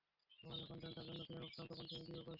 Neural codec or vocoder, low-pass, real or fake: none; 5.4 kHz; real